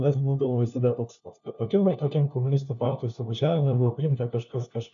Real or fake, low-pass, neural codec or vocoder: fake; 7.2 kHz; codec, 16 kHz, 1 kbps, FunCodec, trained on LibriTTS, 50 frames a second